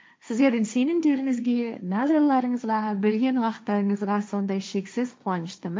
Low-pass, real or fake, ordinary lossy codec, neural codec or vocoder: none; fake; none; codec, 16 kHz, 1.1 kbps, Voila-Tokenizer